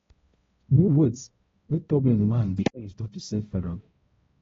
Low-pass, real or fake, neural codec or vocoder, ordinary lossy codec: 7.2 kHz; fake; codec, 16 kHz, 0.5 kbps, X-Codec, HuBERT features, trained on balanced general audio; AAC, 24 kbps